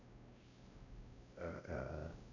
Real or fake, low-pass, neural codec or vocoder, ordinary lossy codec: fake; 7.2 kHz; codec, 16 kHz, 0.5 kbps, X-Codec, WavLM features, trained on Multilingual LibriSpeech; AAC, 48 kbps